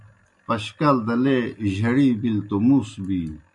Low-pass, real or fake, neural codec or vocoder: 10.8 kHz; real; none